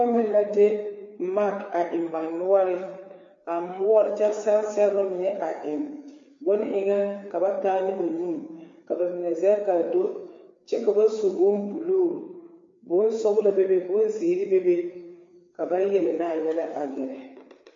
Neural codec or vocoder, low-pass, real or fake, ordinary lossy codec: codec, 16 kHz, 4 kbps, FreqCodec, larger model; 7.2 kHz; fake; MP3, 48 kbps